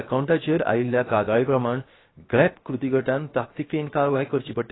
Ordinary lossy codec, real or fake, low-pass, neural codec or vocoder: AAC, 16 kbps; fake; 7.2 kHz; codec, 16 kHz, 0.7 kbps, FocalCodec